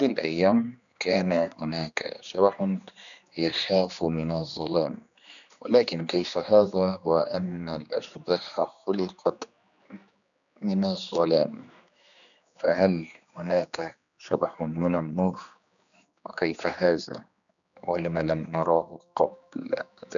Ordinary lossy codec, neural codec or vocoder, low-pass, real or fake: none; codec, 16 kHz, 2 kbps, X-Codec, HuBERT features, trained on general audio; 7.2 kHz; fake